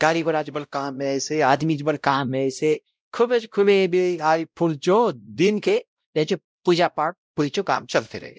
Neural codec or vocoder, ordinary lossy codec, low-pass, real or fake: codec, 16 kHz, 0.5 kbps, X-Codec, WavLM features, trained on Multilingual LibriSpeech; none; none; fake